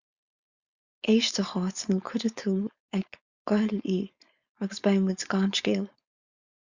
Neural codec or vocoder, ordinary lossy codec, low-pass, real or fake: codec, 16 kHz, 4.8 kbps, FACodec; Opus, 64 kbps; 7.2 kHz; fake